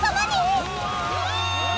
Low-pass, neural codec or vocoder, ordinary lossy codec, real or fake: none; none; none; real